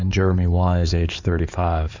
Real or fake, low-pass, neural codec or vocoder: fake; 7.2 kHz; codec, 16 kHz in and 24 kHz out, 2.2 kbps, FireRedTTS-2 codec